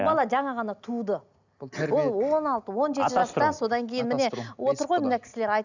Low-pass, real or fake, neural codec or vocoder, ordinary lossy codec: 7.2 kHz; real; none; none